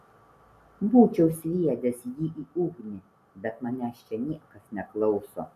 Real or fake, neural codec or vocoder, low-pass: real; none; 14.4 kHz